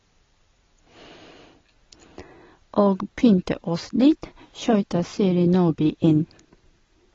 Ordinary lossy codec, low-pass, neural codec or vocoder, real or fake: AAC, 24 kbps; 7.2 kHz; none; real